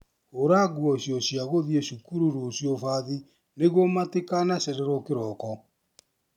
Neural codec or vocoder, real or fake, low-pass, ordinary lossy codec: none; real; 19.8 kHz; none